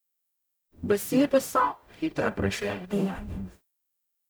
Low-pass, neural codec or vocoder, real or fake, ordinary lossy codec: none; codec, 44.1 kHz, 0.9 kbps, DAC; fake; none